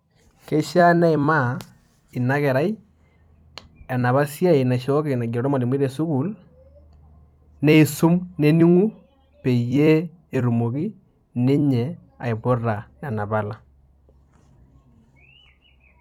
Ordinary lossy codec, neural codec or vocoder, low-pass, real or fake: none; vocoder, 44.1 kHz, 128 mel bands every 256 samples, BigVGAN v2; 19.8 kHz; fake